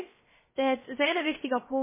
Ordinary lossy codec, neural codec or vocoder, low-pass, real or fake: MP3, 16 kbps; codec, 16 kHz, 0.3 kbps, FocalCodec; 3.6 kHz; fake